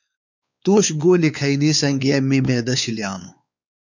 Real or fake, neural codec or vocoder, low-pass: fake; codec, 16 kHz, 4 kbps, X-Codec, WavLM features, trained on Multilingual LibriSpeech; 7.2 kHz